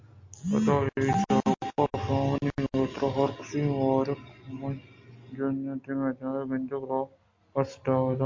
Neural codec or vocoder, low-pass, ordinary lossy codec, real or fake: none; 7.2 kHz; MP3, 48 kbps; real